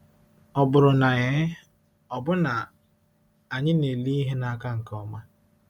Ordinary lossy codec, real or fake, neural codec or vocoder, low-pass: none; real; none; 19.8 kHz